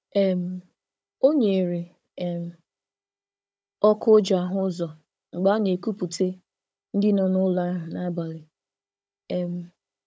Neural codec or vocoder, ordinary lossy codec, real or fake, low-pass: codec, 16 kHz, 16 kbps, FunCodec, trained on Chinese and English, 50 frames a second; none; fake; none